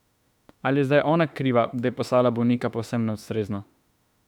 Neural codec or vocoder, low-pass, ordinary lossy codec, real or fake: autoencoder, 48 kHz, 32 numbers a frame, DAC-VAE, trained on Japanese speech; 19.8 kHz; none; fake